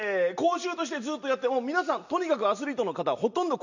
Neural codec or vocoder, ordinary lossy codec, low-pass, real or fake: none; none; 7.2 kHz; real